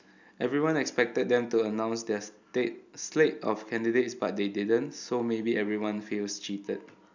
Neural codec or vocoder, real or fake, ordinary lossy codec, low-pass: none; real; none; 7.2 kHz